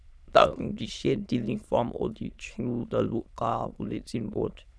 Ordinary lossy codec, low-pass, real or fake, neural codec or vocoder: none; none; fake; autoencoder, 22.05 kHz, a latent of 192 numbers a frame, VITS, trained on many speakers